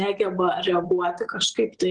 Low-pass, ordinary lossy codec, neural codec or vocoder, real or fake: 9.9 kHz; Opus, 16 kbps; none; real